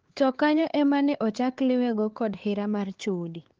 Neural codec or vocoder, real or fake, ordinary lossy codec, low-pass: codec, 16 kHz, 4 kbps, X-Codec, HuBERT features, trained on LibriSpeech; fake; Opus, 16 kbps; 7.2 kHz